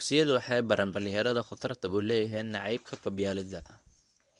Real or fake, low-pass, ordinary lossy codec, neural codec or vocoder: fake; 10.8 kHz; none; codec, 24 kHz, 0.9 kbps, WavTokenizer, medium speech release version 1